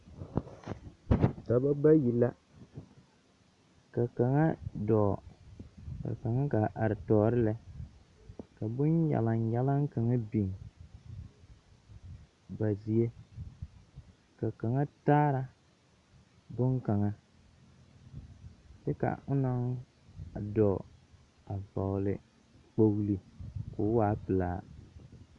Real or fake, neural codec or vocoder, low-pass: real; none; 10.8 kHz